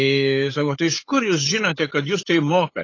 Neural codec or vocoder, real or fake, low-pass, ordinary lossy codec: codec, 16 kHz, 8 kbps, FunCodec, trained on LibriTTS, 25 frames a second; fake; 7.2 kHz; AAC, 32 kbps